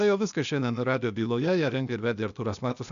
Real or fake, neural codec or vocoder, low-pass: fake; codec, 16 kHz, 0.8 kbps, ZipCodec; 7.2 kHz